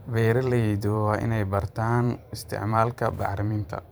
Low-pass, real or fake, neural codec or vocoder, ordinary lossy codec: none; fake; vocoder, 44.1 kHz, 128 mel bands every 512 samples, BigVGAN v2; none